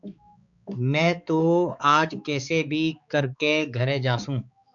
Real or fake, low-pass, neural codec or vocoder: fake; 7.2 kHz; codec, 16 kHz, 4 kbps, X-Codec, HuBERT features, trained on balanced general audio